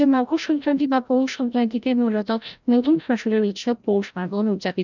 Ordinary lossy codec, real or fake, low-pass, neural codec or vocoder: none; fake; 7.2 kHz; codec, 16 kHz, 0.5 kbps, FreqCodec, larger model